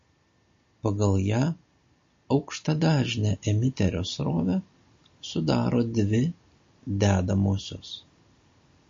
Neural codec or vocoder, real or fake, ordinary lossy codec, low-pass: none; real; MP3, 32 kbps; 7.2 kHz